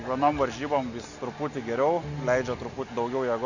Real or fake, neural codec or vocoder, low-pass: real; none; 7.2 kHz